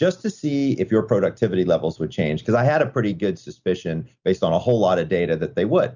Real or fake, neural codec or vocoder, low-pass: real; none; 7.2 kHz